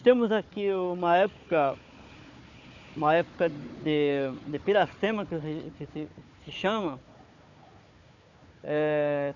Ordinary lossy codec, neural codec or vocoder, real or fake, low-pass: none; codec, 16 kHz, 4 kbps, FunCodec, trained on Chinese and English, 50 frames a second; fake; 7.2 kHz